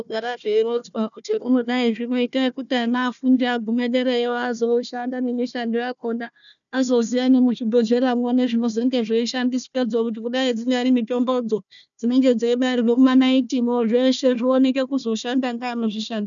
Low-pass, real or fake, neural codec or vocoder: 7.2 kHz; fake; codec, 16 kHz, 1 kbps, FunCodec, trained on Chinese and English, 50 frames a second